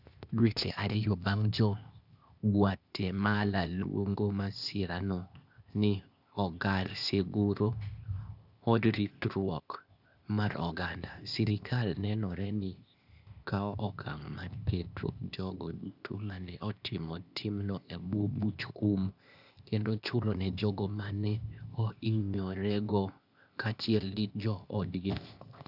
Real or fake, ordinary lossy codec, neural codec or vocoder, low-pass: fake; none; codec, 16 kHz, 0.8 kbps, ZipCodec; 5.4 kHz